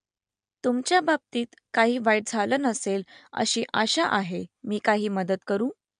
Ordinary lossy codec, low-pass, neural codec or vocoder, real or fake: MP3, 64 kbps; 9.9 kHz; none; real